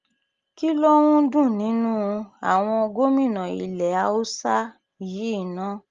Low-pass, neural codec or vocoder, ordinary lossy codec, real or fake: 7.2 kHz; none; Opus, 32 kbps; real